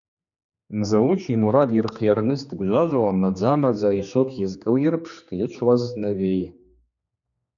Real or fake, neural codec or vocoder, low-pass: fake; codec, 16 kHz, 2 kbps, X-Codec, HuBERT features, trained on general audio; 7.2 kHz